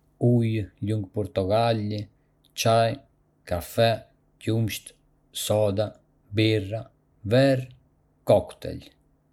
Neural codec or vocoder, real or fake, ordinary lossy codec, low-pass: none; real; none; 19.8 kHz